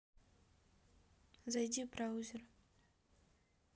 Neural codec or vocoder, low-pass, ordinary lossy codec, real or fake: none; none; none; real